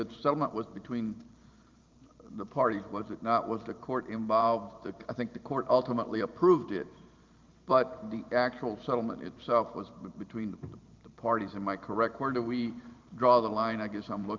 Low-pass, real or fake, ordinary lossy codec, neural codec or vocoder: 7.2 kHz; fake; Opus, 32 kbps; vocoder, 44.1 kHz, 128 mel bands every 512 samples, BigVGAN v2